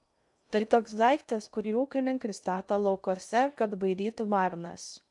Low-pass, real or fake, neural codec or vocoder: 10.8 kHz; fake; codec, 16 kHz in and 24 kHz out, 0.6 kbps, FocalCodec, streaming, 2048 codes